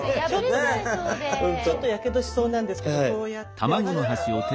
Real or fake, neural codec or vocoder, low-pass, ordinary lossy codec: real; none; none; none